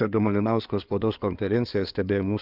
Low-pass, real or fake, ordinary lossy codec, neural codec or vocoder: 5.4 kHz; fake; Opus, 24 kbps; codec, 16 kHz in and 24 kHz out, 2.2 kbps, FireRedTTS-2 codec